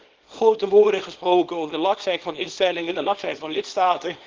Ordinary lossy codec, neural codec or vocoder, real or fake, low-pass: Opus, 16 kbps; codec, 24 kHz, 0.9 kbps, WavTokenizer, small release; fake; 7.2 kHz